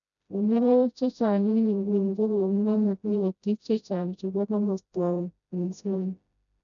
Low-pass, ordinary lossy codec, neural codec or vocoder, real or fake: 7.2 kHz; none; codec, 16 kHz, 0.5 kbps, FreqCodec, smaller model; fake